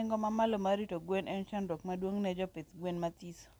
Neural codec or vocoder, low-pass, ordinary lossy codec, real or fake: none; none; none; real